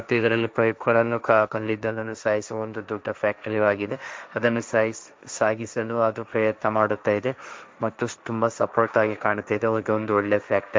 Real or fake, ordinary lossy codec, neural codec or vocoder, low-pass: fake; none; codec, 16 kHz, 1.1 kbps, Voila-Tokenizer; none